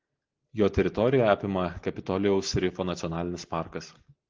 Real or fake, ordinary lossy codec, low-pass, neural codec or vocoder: real; Opus, 16 kbps; 7.2 kHz; none